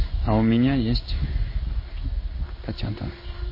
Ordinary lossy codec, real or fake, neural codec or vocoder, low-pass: MP3, 24 kbps; fake; codec, 16 kHz in and 24 kHz out, 1 kbps, XY-Tokenizer; 5.4 kHz